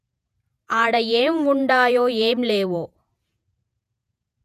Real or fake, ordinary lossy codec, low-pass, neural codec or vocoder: fake; none; 14.4 kHz; vocoder, 48 kHz, 128 mel bands, Vocos